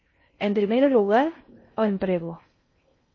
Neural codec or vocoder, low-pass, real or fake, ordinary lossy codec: codec, 16 kHz in and 24 kHz out, 0.6 kbps, FocalCodec, streaming, 4096 codes; 7.2 kHz; fake; MP3, 32 kbps